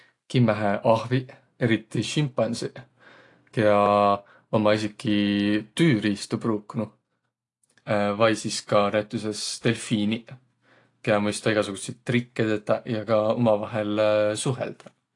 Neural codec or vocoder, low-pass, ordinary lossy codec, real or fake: none; 10.8 kHz; AAC, 48 kbps; real